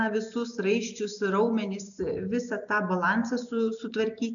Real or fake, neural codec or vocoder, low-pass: real; none; 7.2 kHz